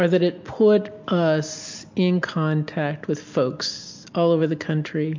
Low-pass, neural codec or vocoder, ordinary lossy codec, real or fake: 7.2 kHz; none; MP3, 48 kbps; real